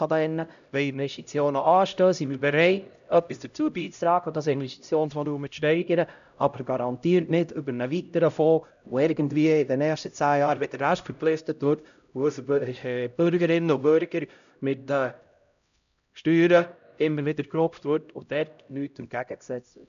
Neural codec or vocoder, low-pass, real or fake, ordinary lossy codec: codec, 16 kHz, 0.5 kbps, X-Codec, HuBERT features, trained on LibriSpeech; 7.2 kHz; fake; none